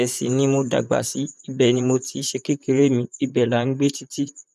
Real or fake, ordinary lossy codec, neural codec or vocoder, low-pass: fake; none; vocoder, 44.1 kHz, 128 mel bands, Pupu-Vocoder; 14.4 kHz